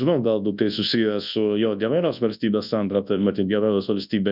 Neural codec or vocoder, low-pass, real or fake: codec, 24 kHz, 0.9 kbps, WavTokenizer, large speech release; 5.4 kHz; fake